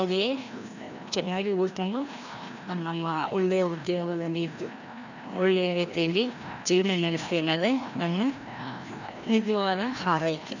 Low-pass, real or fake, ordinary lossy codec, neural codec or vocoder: 7.2 kHz; fake; none; codec, 16 kHz, 1 kbps, FreqCodec, larger model